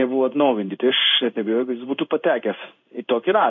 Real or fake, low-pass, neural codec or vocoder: fake; 7.2 kHz; codec, 16 kHz in and 24 kHz out, 1 kbps, XY-Tokenizer